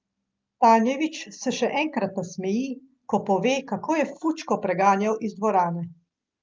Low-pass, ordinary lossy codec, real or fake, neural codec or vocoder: 7.2 kHz; Opus, 24 kbps; real; none